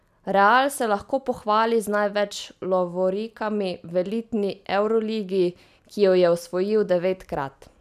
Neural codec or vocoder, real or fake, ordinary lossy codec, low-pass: none; real; none; 14.4 kHz